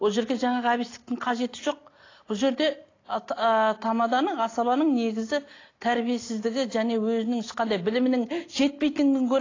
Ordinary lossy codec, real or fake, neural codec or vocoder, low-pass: AAC, 32 kbps; real; none; 7.2 kHz